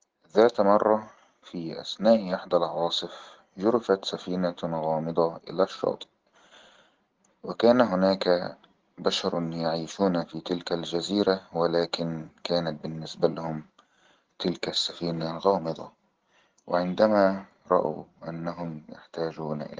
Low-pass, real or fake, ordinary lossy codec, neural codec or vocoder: 7.2 kHz; real; Opus, 16 kbps; none